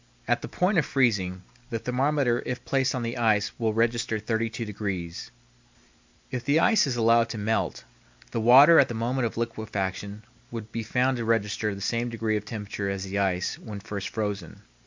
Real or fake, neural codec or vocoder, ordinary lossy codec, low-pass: real; none; MP3, 64 kbps; 7.2 kHz